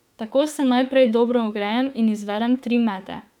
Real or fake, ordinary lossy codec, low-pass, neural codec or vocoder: fake; none; 19.8 kHz; autoencoder, 48 kHz, 32 numbers a frame, DAC-VAE, trained on Japanese speech